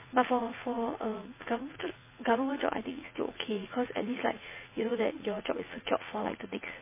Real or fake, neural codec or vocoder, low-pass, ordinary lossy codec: fake; vocoder, 44.1 kHz, 80 mel bands, Vocos; 3.6 kHz; MP3, 16 kbps